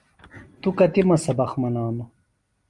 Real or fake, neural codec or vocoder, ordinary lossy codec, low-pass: real; none; Opus, 32 kbps; 10.8 kHz